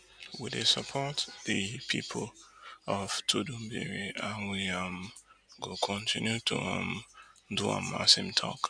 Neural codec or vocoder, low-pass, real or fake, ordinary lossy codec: none; 9.9 kHz; real; none